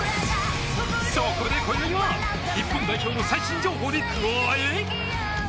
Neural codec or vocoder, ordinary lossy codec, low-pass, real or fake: none; none; none; real